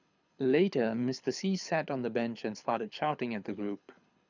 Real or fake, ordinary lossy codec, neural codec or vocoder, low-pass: fake; none; codec, 24 kHz, 6 kbps, HILCodec; 7.2 kHz